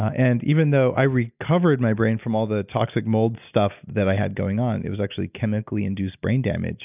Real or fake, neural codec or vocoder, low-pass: real; none; 3.6 kHz